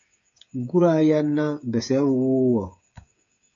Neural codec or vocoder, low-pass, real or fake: codec, 16 kHz, 8 kbps, FreqCodec, smaller model; 7.2 kHz; fake